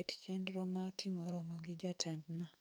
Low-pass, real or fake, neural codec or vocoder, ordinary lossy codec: none; fake; codec, 44.1 kHz, 2.6 kbps, SNAC; none